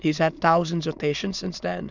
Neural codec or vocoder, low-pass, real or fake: autoencoder, 22.05 kHz, a latent of 192 numbers a frame, VITS, trained on many speakers; 7.2 kHz; fake